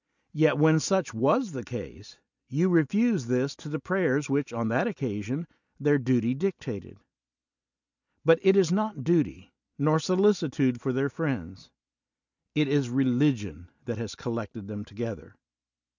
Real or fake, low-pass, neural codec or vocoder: real; 7.2 kHz; none